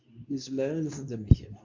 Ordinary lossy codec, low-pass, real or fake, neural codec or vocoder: MP3, 48 kbps; 7.2 kHz; fake; codec, 24 kHz, 0.9 kbps, WavTokenizer, medium speech release version 2